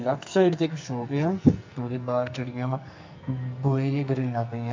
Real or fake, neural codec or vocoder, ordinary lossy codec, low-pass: fake; codec, 44.1 kHz, 2.6 kbps, SNAC; MP3, 48 kbps; 7.2 kHz